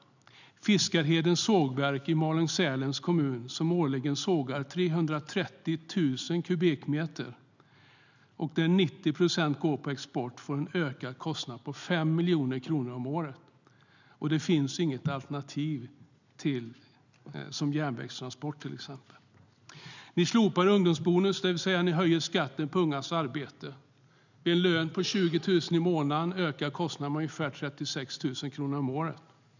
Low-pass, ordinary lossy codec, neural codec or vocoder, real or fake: 7.2 kHz; none; none; real